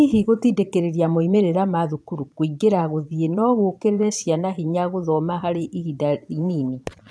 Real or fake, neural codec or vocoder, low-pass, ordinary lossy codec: fake; vocoder, 22.05 kHz, 80 mel bands, Vocos; none; none